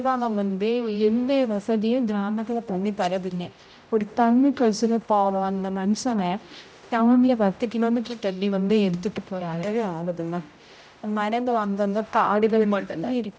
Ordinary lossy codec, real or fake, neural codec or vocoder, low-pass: none; fake; codec, 16 kHz, 0.5 kbps, X-Codec, HuBERT features, trained on general audio; none